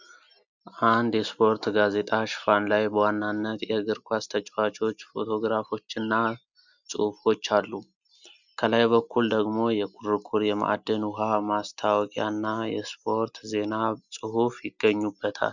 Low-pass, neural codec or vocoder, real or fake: 7.2 kHz; none; real